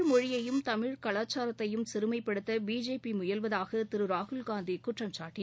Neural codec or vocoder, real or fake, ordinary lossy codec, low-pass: none; real; none; none